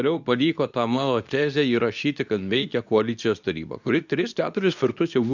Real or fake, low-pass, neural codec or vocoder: fake; 7.2 kHz; codec, 24 kHz, 0.9 kbps, WavTokenizer, medium speech release version 2